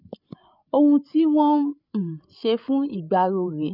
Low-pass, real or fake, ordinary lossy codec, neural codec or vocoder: 5.4 kHz; fake; none; codec, 16 kHz, 8 kbps, FreqCodec, larger model